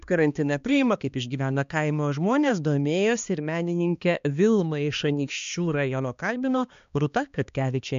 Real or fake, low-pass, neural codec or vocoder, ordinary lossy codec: fake; 7.2 kHz; codec, 16 kHz, 2 kbps, X-Codec, HuBERT features, trained on balanced general audio; MP3, 64 kbps